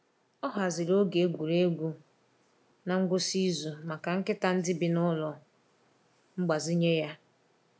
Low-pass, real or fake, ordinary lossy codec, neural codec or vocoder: none; real; none; none